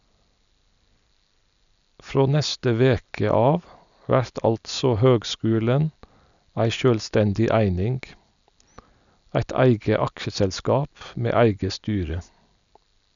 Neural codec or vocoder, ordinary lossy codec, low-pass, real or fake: none; none; 7.2 kHz; real